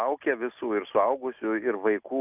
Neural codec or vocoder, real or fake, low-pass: none; real; 3.6 kHz